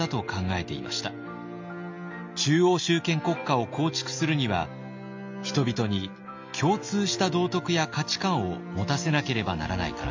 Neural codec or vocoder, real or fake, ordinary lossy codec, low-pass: none; real; MP3, 48 kbps; 7.2 kHz